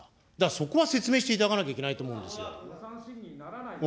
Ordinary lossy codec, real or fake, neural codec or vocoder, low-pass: none; real; none; none